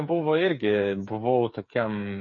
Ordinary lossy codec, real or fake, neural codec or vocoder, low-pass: MP3, 32 kbps; fake; codec, 16 kHz, 8 kbps, FreqCodec, smaller model; 7.2 kHz